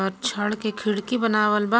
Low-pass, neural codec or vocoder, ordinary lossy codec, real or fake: none; none; none; real